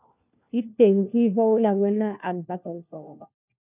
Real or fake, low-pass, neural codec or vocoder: fake; 3.6 kHz; codec, 16 kHz, 1 kbps, FunCodec, trained on LibriTTS, 50 frames a second